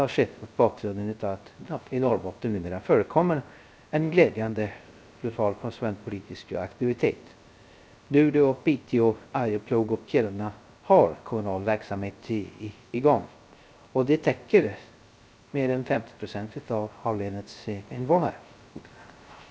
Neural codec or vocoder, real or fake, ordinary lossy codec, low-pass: codec, 16 kHz, 0.3 kbps, FocalCodec; fake; none; none